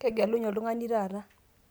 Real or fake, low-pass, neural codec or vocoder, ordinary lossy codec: real; none; none; none